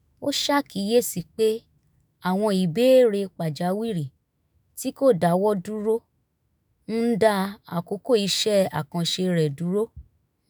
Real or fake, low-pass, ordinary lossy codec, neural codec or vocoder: fake; none; none; autoencoder, 48 kHz, 128 numbers a frame, DAC-VAE, trained on Japanese speech